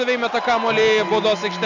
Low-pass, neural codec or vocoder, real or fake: 7.2 kHz; none; real